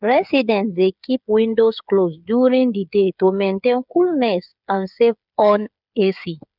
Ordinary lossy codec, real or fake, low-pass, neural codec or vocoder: none; fake; 5.4 kHz; codec, 16 kHz, 8 kbps, FreqCodec, smaller model